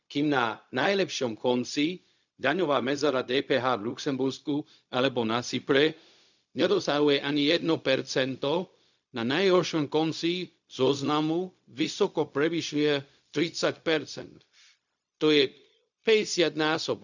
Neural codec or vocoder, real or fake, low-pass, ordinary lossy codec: codec, 16 kHz, 0.4 kbps, LongCat-Audio-Codec; fake; 7.2 kHz; none